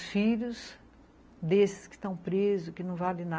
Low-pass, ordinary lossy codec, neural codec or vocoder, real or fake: none; none; none; real